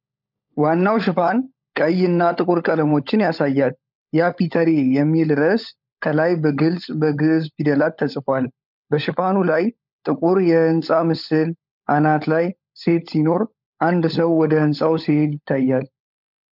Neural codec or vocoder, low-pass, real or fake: codec, 16 kHz, 16 kbps, FunCodec, trained on LibriTTS, 50 frames a second; 5.4 kHz; fake